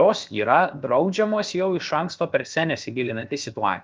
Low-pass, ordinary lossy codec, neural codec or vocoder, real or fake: 7.2 kHz; Opus, 32 kbps; codec, 16 kHz, 0.7 kbps, FocalCodec; fake